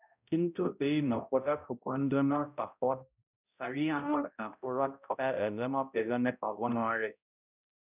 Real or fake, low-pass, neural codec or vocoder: fake; 3.6 kHz; codec, 16 kHz, 0.5 kbps, X-Codec, HuBERT features, trained on balanced general audio